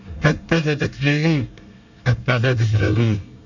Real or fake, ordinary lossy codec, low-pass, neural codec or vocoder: fake; none; 7.2 kHz; codec, 24 kHz, 1 kbps, SNAC